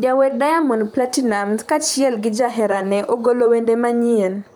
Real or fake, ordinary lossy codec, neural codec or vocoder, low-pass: fake; none; vocoder, 44.1 kHz, 128 mel bands, Pupu-Vocoder; none